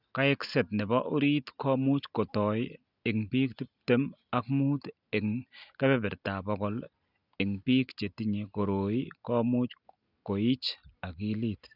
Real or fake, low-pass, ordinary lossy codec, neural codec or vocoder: real; 5.4 kHz; none; none